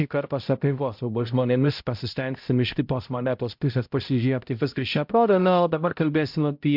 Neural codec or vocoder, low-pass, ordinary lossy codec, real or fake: codec, 16 kHz, 0.5 kbps, X-Codec, HuBERT features, trained on balanced general audio; 5.4 kHz; MP3, 48 kbps; fake